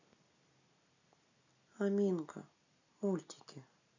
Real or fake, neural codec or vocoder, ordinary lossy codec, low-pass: real; none; none; 7.2 kHz